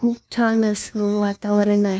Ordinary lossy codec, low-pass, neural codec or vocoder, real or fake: none; none; codec, 16 kHz, 1 kbps, FunCodec, trained on LibriTTS, 50 frames a second; fake